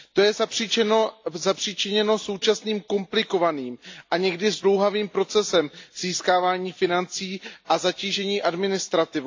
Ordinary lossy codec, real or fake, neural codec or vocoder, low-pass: AAC, 48 kbps; real; none; 7.2 kHz